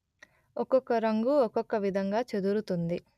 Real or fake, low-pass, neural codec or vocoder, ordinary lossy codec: real; 14.4 kHz; none; none